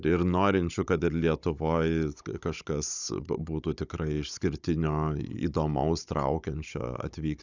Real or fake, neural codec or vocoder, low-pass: fake; codec, 16 kHz, 16 kbps, FunCodec, trained on Chinese and English, 50 frames a second; 7.2 kHz